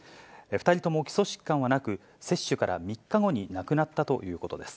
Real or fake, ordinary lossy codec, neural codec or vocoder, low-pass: real; none; none; none